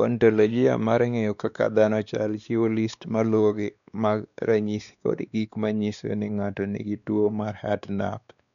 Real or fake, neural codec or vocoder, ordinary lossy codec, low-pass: fake; codec, 16 kHz, 2 kbps, X-Codec, WavLM features, trained on Multilingual LibriSpeech; none; 7.2 kHz